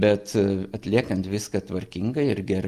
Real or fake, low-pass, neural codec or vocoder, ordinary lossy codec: real; 14.4 kHz; none; Opus, 32 kbps